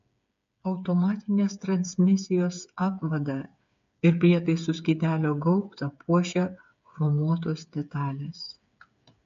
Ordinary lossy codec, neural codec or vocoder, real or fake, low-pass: MP3, 48 kbps; codec, 16 kHz, 8 kbps, FreqCodec, smaller model; fake; 7.2 kHz